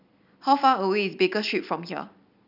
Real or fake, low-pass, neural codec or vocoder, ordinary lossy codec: real; 5.4 kHz; none; none